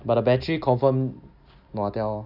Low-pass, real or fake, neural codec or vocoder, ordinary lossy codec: 5.4 kHz; real; none; none